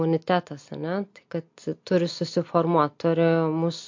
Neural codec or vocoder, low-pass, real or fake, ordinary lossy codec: none; 7.2 kHz; real; MP3, 48 kbps